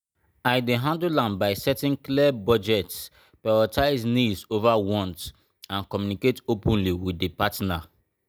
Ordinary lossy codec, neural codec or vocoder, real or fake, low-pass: none; none; real; 19.8 kHz